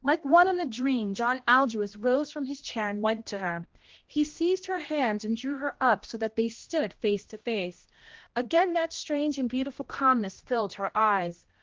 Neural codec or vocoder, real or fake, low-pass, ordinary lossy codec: codec, 16 kHz, 1 kbps, X-Codec, HuBERT features, trained on general audio; fake; 7.2 kHz; Opus, 16 kbps